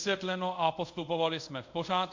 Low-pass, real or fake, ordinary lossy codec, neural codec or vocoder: 7.2 kHz; fake; MP3, 48 kbps; codec, 24 kHz, 0.5 kbps, DualCodec